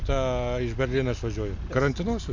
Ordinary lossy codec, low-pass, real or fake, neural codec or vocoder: MP3, 48 kbps; 7.2 kHz; real; none